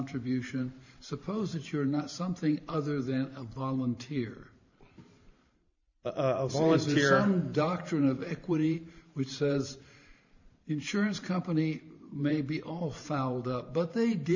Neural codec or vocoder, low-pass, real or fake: vocoder, 44.1 kHz, 128 mel bands every 512 samples, BigVGAN v2; 7.2 kHz; fake